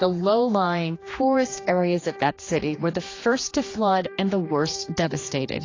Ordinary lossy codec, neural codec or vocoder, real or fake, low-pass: AAC, 32 kbps; codec, 16 kHz, 2 kbps, X-Codec, HuBERT features, trained on general audio; fake; 7.2 kHz